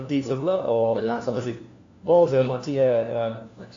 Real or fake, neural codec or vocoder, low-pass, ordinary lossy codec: fake; codec, 16 kHz, 1 kbps, FunCodec, trained on LibriTTS, 50 frames a second; 7.2 kHz; AAC, 48 kbps